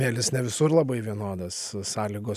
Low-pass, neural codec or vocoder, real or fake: 14.4 kHz; none; real